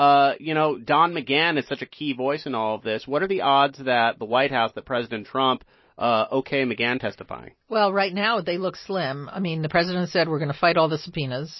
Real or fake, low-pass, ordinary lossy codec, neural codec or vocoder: real; 7.2 kHz; MP3, 24 kbps; none